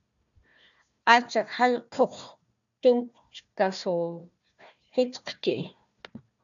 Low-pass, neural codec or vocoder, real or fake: 7.2 kHz; codec, 16 kHz, 1 kbps, FunCodec, trained on Chinese and English, 50 frames a second; fake